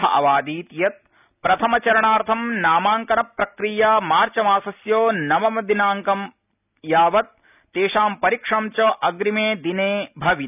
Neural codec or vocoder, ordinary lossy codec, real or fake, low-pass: none; none; real; 3.6 kHz